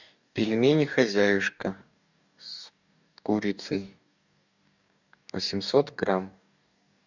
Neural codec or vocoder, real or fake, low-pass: codec, 44.1 kHz, 2.6 kbps, DAC; fake; 7.2 kHz